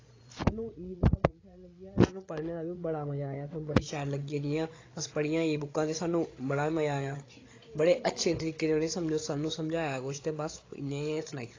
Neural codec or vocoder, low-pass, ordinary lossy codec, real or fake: codec, 16 kHz, 8 kbps, FreqCodec, larger model; 7.2 kHz; AAC, 32 kbps; fake